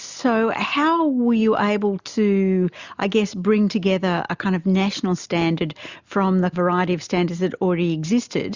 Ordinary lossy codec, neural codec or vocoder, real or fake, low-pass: Opus, 64 kbps; none; real; 7.2 kHz